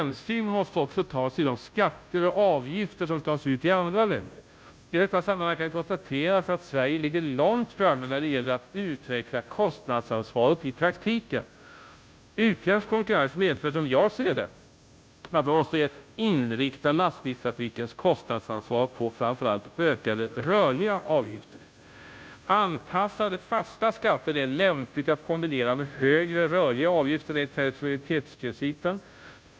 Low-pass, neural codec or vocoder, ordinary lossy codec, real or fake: none; codec, 16 kHz, 0.5 kbps, FunCodec, trained on Chinese and English, 25 frames a second; none; fake